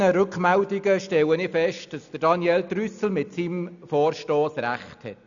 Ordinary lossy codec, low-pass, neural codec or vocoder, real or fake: none; 7.2 kHz; none; real